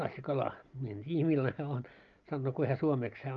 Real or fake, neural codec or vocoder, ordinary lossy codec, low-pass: real; none; Opus, 32 kbps; 7.2 kHz